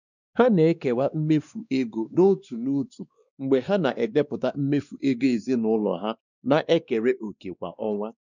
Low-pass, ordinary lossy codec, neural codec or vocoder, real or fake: 7.2 kHz; none; codec, 16 kHz, 2 kbps, X-Codec, WavLM features, trained on Multilingual LibriSpeech; fake